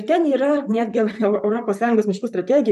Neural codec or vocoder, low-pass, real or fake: vocoder, 44.1 kHz, 128 mel bands, Pupu-Vocoder; 14.4 kHz; fake